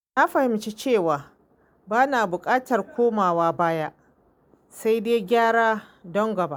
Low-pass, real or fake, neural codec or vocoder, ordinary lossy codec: none; real; none; none